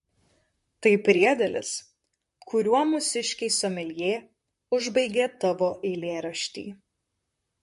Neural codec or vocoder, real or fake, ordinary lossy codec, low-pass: vocoder, 44.1 kHz, 128 mel bands, Pupu-Vocoder; fake; MP3, 48 kbps; 14.4 kHz